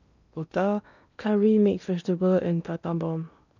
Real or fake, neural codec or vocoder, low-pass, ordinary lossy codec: fake; codec, 16 kHz in and 24 kHz out, 0.8 kbps, FocalCodec, streaming, 65536 codes; 7.2 kHz; none